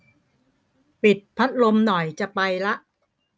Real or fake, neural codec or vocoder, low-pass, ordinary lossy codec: real; none; none; none